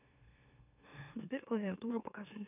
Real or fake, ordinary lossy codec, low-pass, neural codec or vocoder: fake; none; 3.6 kHz; autoencoder, 44.1 kHz, a latent of 192 numbers a frame, MeloTTS